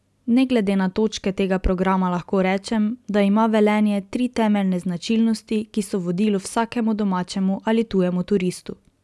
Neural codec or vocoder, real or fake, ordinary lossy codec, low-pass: none; real; none; none